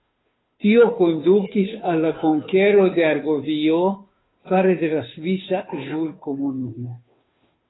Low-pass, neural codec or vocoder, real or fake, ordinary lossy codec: 7.2 kHz; codec, 16 kHz, 2 kbps, FunCodec, trained on Chinese and English, 25 frames a second; fake; AAC, 16 kbps